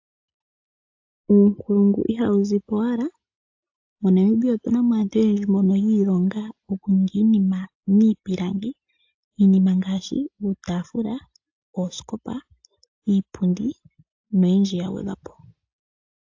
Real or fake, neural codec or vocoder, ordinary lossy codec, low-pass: real; none; AAC, 48 kbps; 7.2 kHz